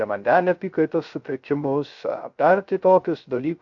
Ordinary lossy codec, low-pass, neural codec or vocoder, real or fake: AAC, 64 kbps; 7.2 kHz; codec, 16 kHz, 0.3 kbps, FocalCodec; fake